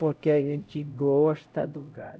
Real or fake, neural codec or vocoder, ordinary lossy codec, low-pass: fake; codec, 16 kHz, 0.5 kbps, X-Codec, HuBERT features, trained on LibriSpeech; none; none